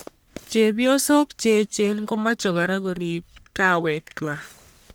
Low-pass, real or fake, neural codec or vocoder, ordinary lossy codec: none; fake; codec, 44.1 kHz, 1.7 kbps, Pupu-Codec; none